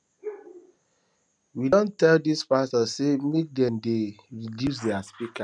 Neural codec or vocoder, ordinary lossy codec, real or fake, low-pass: none; none; real; 9.9 kHz